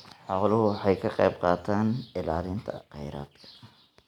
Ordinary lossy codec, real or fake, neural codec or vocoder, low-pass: none; real; none; 19.8 kHz